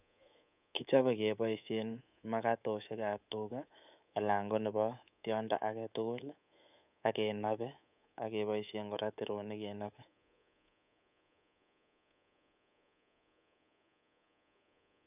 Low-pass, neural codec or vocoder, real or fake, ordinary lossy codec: 3.6 kHz; codec, 24 kHz, 3.1 kbps, DualCodec; fake; none